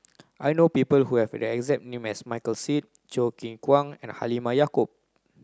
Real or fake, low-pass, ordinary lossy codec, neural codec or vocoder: real; none; none; none